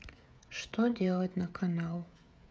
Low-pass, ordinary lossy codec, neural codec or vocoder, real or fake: none; none; codec, 16 kHz, 16 kbps, FreqCodec, smaller model; fake